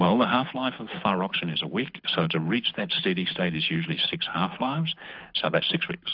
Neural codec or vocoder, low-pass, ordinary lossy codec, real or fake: codec, 24 kHz, 6 kbps, HILCodec; 5.4 kHz; AAC, 48 kbps; fake